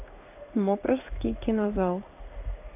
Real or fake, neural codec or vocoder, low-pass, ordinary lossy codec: fake; codec, 16 kHz in and 24 kHz out, 1 kbps, XY-Tokenizer; 3.6 kHz; none